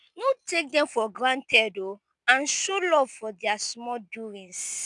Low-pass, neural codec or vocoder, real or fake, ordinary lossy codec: 10.8 kHz; none; real; none